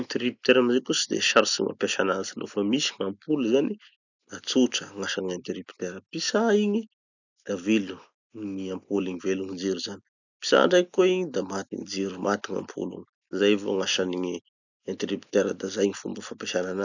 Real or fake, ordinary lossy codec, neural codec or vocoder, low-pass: real; none; none; 7.2 kHz